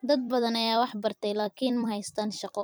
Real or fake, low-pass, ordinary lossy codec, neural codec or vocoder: fake; none; none; vocoder, 44.1 kHz, 128 mel bands every 256 samples, BigVGAN v2